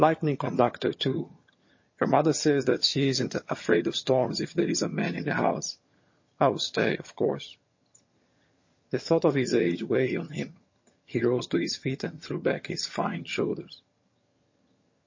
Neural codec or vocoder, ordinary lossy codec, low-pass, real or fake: vocoder, 22.05 kHz, 80 mel bands, HiFi-GAN; MP3, 32 kbps; 7.2 kHz; fake